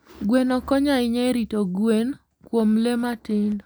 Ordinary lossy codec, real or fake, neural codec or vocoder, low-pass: none; real; none; none